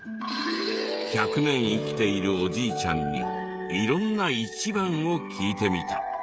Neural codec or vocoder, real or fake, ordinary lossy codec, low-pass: codec, 16 kHz, 16 kbps, FreqCodec, smaller model; fake; none; none